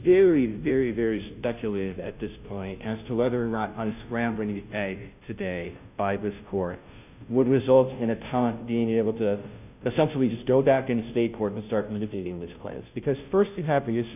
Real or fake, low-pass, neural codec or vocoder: fake; 3.6 kHz; codec, 16 kHz, 0.5 kbps, FunCodec, trained on Chinese and English, 25 frames a second